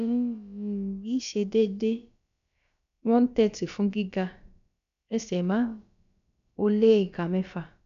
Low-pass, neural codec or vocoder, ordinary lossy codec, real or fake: 7.2 kHz; codec, 16 kHz, about 1 kbps, DyCAST, with the encoder's durations; AAC, 96 kbps; fake